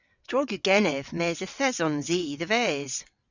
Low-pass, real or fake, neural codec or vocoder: 7.2 kHz; fake; vocoder, 22.05 kHz, 80 mel bands, WaveNeXt